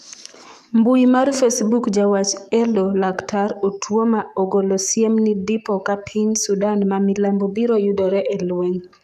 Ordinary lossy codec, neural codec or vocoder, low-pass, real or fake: none; codec, 44.1 kHz, 7.8 kbps, DAC; 14.4 kHz; fake